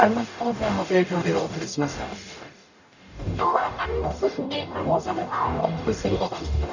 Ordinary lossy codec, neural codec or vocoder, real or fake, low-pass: none; codec, 44.1 kHz, 0.9 kbps, DAC; fake; 7.2 kHz